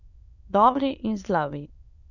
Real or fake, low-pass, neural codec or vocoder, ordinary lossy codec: fake; 7.2 kHz; autoencoder, 22.05 kHz, a latent of 192 numbers a frame, VITS, trained on many speakers; none